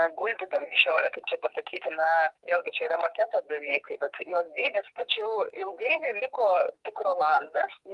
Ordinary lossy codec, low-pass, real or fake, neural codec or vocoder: Opus, 32 kbps; 10.8 kHz; fake; codec, 44.1 kHz, 2.6 kbps, SNAC